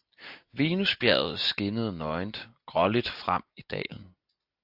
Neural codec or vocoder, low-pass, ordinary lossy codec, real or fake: none; 5.4 kHz; AAC, 48 kbps; real